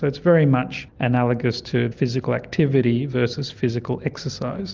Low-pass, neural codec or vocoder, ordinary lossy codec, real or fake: 7.2 kHz; none; Opus, 32 kbps; real